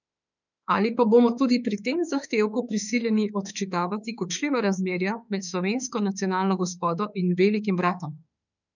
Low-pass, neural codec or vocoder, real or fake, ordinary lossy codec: 7.2 kHz; autoencoder, 48 kHz, 32 numbers a frame, DAC-VAE, trained on Japanese speech; fake; none